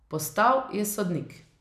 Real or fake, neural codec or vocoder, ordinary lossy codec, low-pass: real; none; none; 14.4 kHz